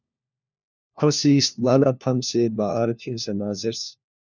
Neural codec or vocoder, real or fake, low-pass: codec, 16 kHz, 1 kbps, FunCodec, trained on LibriTTS, 50 frames a second; fake; 7.2 kHz